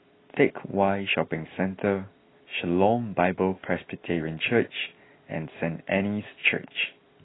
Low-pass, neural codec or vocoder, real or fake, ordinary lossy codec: 7.2 kHz; none; real; AAC, 16 kbps